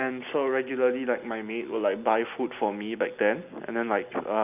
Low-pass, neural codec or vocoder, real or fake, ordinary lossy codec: 3.6 kHz; none; real; none